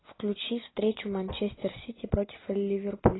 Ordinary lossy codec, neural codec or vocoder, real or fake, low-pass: AAC, 16 kbps; none; real; 7.2 kHz